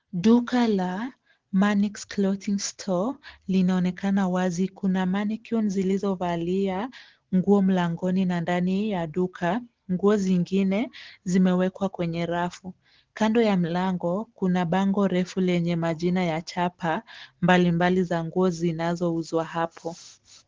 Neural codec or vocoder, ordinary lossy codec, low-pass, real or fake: none; Opus, 16 kbps; 7.2 kHz; real